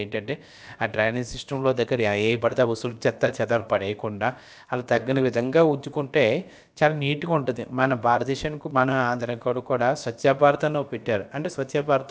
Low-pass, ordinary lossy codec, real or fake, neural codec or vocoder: none; none; fake; codec, 16 kHz, about 1 kbps, DyCAST, with the encoder's durations